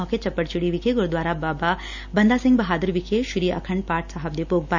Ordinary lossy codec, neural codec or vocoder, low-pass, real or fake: none; none; 7.2 kHz; real